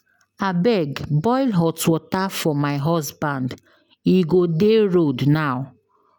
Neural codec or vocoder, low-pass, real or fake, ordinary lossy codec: none; 19.8 kHz; real; none